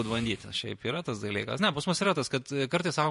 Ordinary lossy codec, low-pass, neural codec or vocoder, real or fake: MP3, 48 kbps; 14.4 kHz; vocoder, 48 kHz, 128 mel bands, Vocos; fake